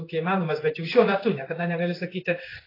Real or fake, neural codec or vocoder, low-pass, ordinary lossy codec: fake; codec, 16 kHz in and 24 kHz out, 1 kbps, XY-Tokenizer; 5.4 kHz; AAC, 24 kbps